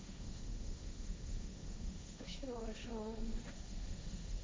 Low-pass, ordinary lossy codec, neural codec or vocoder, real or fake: none; none; codec, 16 kHz, 1.1 kbps, Voila-Tokenizer; fake